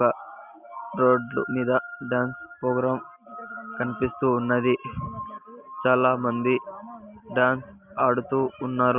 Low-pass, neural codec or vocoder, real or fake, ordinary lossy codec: 3.6 kHz; none; real; Opus, 64 kbps